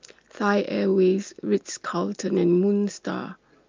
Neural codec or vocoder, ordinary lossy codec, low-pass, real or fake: none; Opus, 24 kbps; 7.2 kHz; real